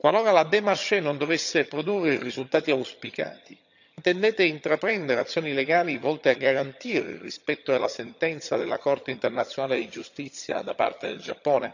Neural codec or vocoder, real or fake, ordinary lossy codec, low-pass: vocoder, 22.05 kHz, 80 mel bands, HiFi-GAN; fake; none; 7.2 kHz